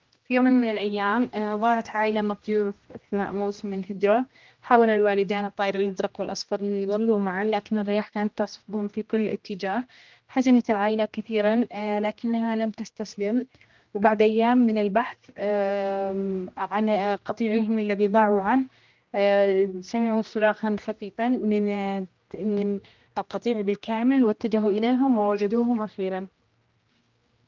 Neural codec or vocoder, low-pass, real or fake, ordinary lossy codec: codec, 16 kHz, 1 kbps, X-Codec, HuBERT features, trained on general audio; 7.2 kHz; fake; Opus, 32 kbps